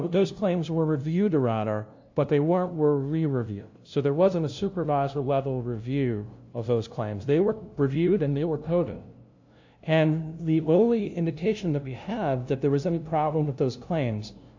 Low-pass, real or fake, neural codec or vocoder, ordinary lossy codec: 7.2 kHz; fake; codec, 16 kHz, 0.5 kbps, FunCodec, trained on LibriTTS, 25 frames a second; AAC, 48 kbps